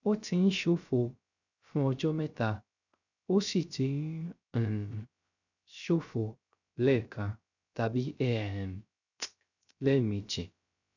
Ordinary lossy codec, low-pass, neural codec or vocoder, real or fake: none; 7.2 kHz; codec, 16 kHz, 0.3 kbps, FocalCodec; fake